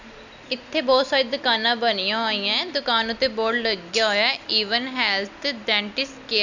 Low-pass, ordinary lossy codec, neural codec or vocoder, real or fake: 7.2 kHz; none; none; real